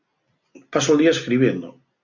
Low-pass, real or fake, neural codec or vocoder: 7.2 kHz; real; none